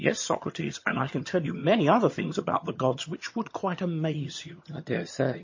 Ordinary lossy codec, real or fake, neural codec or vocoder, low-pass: MP3, 32 kbps; fake; vocoder, 22.05 kHz, 80 mel bands, HiFi-GAN; 7.2 kHz